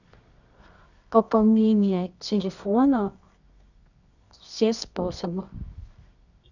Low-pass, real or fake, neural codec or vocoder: 7.2 kHz; fake; codec, 24 kHz, 0.9 kbps, WavTokenizer, medium music audio release